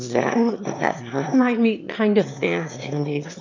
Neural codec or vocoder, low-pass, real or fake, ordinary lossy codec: autoencoder, 22.05 kHz, a latent of 192 numbers a frame, VITS, trained on one speaker; 7.2 kHz; fake; MP3, 64 kbps